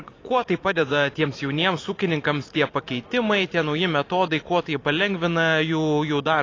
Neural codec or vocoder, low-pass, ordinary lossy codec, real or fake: vocoder, 44.1 kHz, 128 mel bands every 256 samples, BigVGAN v2; 7.2 kHz; AAC, 32 kbps; fake